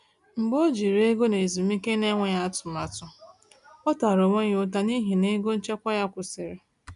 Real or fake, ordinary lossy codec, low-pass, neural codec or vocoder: real; none; 10.8 kHz; none